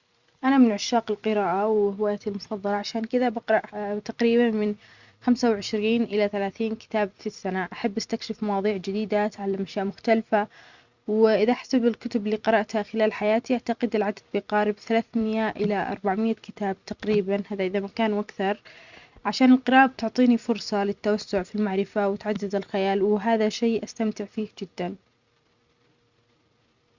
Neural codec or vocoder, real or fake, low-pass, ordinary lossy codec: vocoder, 44.1 kHz, 128 mel bands every 256 samples, BigVGAN v2; fake; 7.2 kHz; Opus, 64 kbps